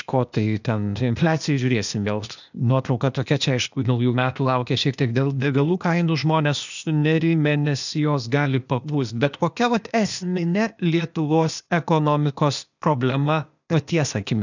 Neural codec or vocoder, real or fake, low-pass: codec, 16 kHz, 0.8 kbps, ZipCodec; fake; 7.2 kHz